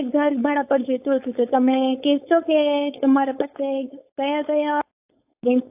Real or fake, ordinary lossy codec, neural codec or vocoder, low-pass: fake; AAC, 32 kbps; codec, 16 kHz, 4.8 kbps, FACodec; 3.6 kHz